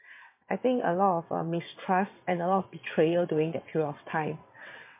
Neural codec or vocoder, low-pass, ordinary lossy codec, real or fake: vocoder, 22.05 kHz, 80 mel bands, WaveNeXt; 3.6 kHz; MP3, 24 kbps; fake